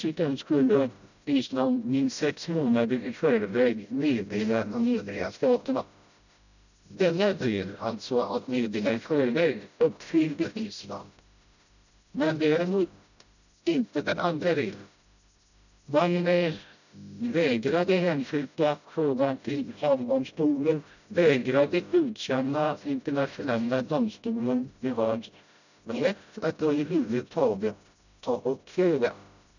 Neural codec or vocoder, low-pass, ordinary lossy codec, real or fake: codec, 16 kHz, 0.5 kbps, FreqCodec, smaller model; 7.2 kHz; none; fake